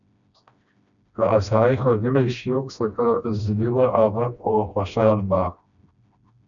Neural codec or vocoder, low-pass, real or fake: codec, 16 kHz, 1 kbps, FreqCodec, smaller model; 7.2 kHz; fake